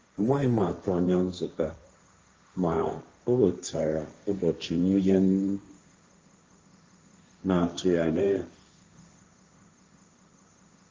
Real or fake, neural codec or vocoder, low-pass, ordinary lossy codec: fake; codec, 16 kHz, 1.1 kbps, Voila-Tokenizer; 7.2 kHz; Opus, 24 kbps